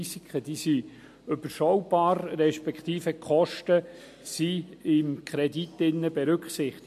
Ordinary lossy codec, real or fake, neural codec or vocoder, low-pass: MP3, 64 kbps; real; none; 14.4 kHz